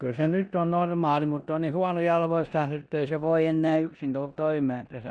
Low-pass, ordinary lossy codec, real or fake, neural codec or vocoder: 9.9 kHz; Opus, 64 kbps; fake; codec, 16 kHz in and 24 kHz out, 0.9 kbps, LongCat-Audio-Codec, four codebook decoder